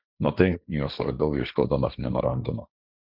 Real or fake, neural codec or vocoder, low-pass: fake; codec, 16 kHz, 1.1 kbps, Voila-Tokenizer; 5.4 kHz